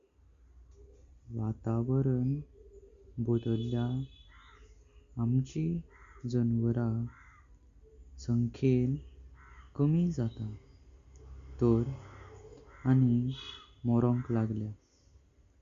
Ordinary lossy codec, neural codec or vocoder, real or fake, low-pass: Opus, 64 kbps; none; real; 7.2 kHz